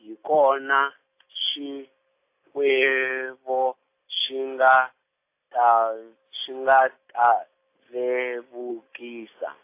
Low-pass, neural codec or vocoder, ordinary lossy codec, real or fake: 3.6 kHz; none; AAC, 24 kbps; real